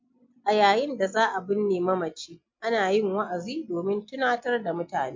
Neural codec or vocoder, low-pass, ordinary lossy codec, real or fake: none; 7.2 kHz; MP3, 48 kbps; real